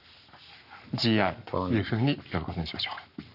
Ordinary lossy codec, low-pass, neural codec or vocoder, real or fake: none; 5.4 kHz; codec, 44.1 kHz, 7.8 kbps, Pupu-Codec; fake